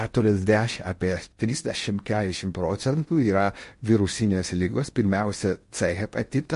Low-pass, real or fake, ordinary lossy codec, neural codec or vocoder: 10.8 kHz; fake; MP3, 48 kbps; codec, 16 kHz in and 24 kHz out, 0.6 kbps, FocalCodec, streaming, 4096 codes